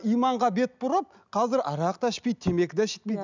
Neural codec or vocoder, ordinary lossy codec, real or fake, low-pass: none; none; real; 7.2 kHz